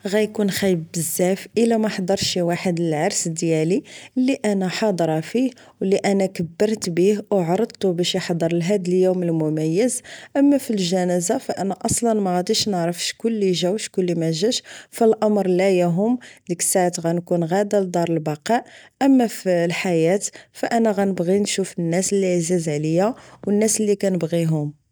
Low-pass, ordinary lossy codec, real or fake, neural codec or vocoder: none; none; real; none